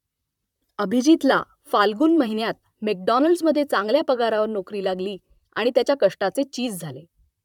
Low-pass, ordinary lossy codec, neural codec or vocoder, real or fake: 19.8 kHz; none; vocoder, 44.1 kHz, 128 mel bands, Pupu-Vocoder; fake